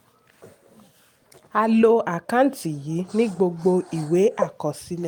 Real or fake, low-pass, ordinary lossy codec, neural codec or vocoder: real; 19.8 kHz; Opus, 32 kbps; none